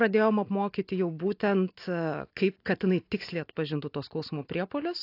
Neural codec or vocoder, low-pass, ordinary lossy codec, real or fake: none; 5.4 kHz; AAC, 32 kbps; real